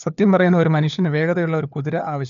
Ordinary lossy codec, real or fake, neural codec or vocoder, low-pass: none; fake; codec, 16 kHz, 4 kbps, FunCodec, trained on LibriTTS, 50 frames a second; 7.2 kHz